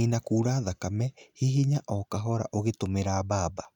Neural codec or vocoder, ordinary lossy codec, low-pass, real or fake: none; none; 19.8 kHz; real